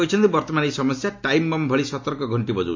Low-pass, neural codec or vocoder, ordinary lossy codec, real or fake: 7.2 kHz; none; AAC, 48 kbps; real